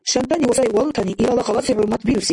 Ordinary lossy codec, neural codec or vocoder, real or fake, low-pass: AAC, 64 kbps; none; real; 10.8 kHz